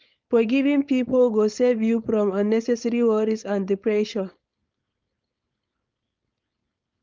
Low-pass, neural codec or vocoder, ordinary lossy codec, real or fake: 7.2 kHz; codec, 16 kHz, 4.8 kbps, FACodec; Opus, 24 kbps; fake